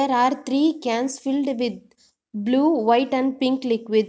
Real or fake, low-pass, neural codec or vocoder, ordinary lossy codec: real; none; none; none